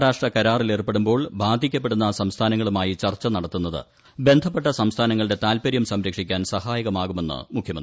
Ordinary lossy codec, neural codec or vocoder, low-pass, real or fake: none; none; none; real